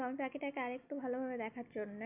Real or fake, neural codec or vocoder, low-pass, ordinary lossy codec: real; none; 3.6 kHz; none